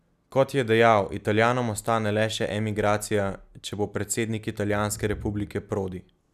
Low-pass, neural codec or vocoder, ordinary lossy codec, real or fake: 14.4 kHz; none; none; real